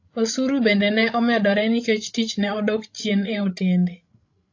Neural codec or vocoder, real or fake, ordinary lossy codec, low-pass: codec, 16 kHz, 16 kbps, FreqCodec, larger model; fake; AAC, 48 kbps; 7.2 kHz